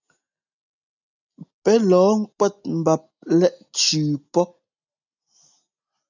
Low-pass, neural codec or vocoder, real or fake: 7.2 kHz; none; real